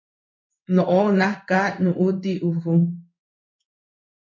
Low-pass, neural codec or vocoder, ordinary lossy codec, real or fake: 7.2 kHz; codec, 16 kHz in and 24 kHz out, 1 kbps, XY-Tokenizer; MP3, 48 kbps; fake